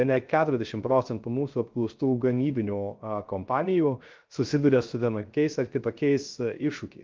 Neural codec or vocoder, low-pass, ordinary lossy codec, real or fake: codec, 16 kHz, 0.3 kbps, FocalCodec; 7.2 kHz; Opus, 32 kbps; fake